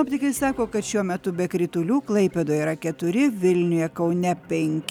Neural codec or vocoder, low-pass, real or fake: none; 19.8 kHz; real